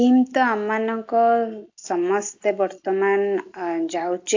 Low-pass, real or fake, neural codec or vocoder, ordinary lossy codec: 7.2 kHz; real; none; AAC, 48 kbps